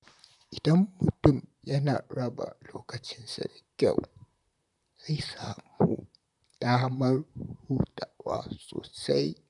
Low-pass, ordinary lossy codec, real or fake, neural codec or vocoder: 10.8 kHz; none; fake; vocoder, 44.1 kHz, 128 mel bands, Pupu-Vocoder